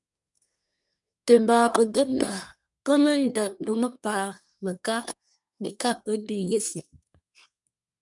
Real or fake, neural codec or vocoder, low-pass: fake; codec, 24 kHz, 1 kbps, SNAC; 10.8 kHz